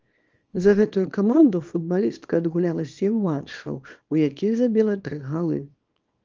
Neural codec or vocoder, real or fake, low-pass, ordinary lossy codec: codec, 24 kHz, 0.9 kbps, WavTokenizer, small release; fake; 7.2 kHz; Opus, 24 kbps